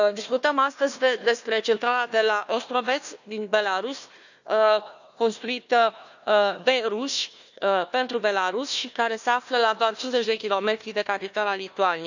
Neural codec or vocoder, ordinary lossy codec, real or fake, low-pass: codec, 16 kHz, 1 kbps, FunCodec, trained on Chinese and English, 50 frames a second; none; fake; 7.2 kHz